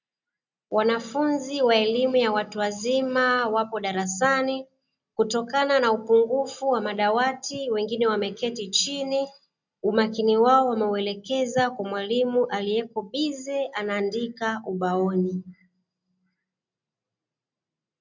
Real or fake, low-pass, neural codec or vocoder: real; 7.2 kHz; none